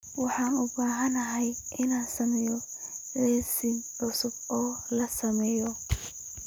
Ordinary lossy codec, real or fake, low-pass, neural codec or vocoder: none; real; none; none